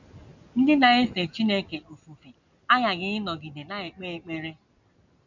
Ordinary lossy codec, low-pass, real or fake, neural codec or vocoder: none; 7.2 kHz; real; none